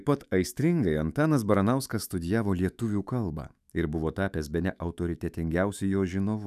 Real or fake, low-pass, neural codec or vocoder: fake; 14.4 kHz; autoencoder, 48 kHz, 128 numbers a frame, DAC-VAE, trained on Japanese speech